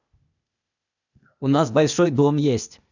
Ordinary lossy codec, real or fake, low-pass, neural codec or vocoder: none; fake; 7.2 kHz; codec, 16 kHz, 0.8 kbps, ZipCodec